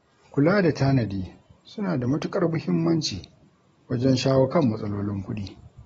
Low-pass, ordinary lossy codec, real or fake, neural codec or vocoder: 9.9 kHz; AAC, 24 kbps; real; none